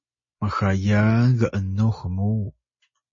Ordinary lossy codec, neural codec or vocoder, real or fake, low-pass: MP3, 32 kbps; none; real; 7.2 kHz